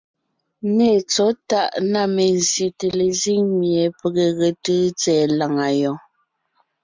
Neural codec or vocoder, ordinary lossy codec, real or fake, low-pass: none; MP3, 64 kbps; real; 7.2 kHz